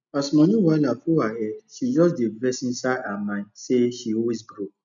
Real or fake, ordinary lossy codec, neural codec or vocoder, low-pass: real; none; none; 7.2 kHz